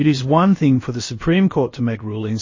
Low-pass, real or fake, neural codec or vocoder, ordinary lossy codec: 7.2 kHz; fake; codec, 16 kHz, about 1 kbps, DyCAST, with the encoder's durations; MP3, 32 kbps